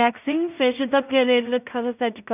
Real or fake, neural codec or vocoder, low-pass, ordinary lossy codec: fake; codec, 16 kHz in and 24 kHz out, 0.4 kbps, LongCat-Audio-Codec, two codebook decoder; 3.6 kHz; none